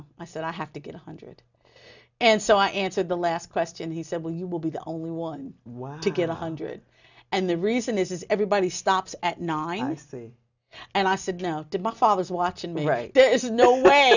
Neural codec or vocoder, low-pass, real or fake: none; 7.2 kHz; real